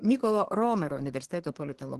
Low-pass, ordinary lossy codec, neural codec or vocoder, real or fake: 10.8 kHz; Opus, 16 kbps; codec, 24 kHz, 1 kbps, SNAC; fake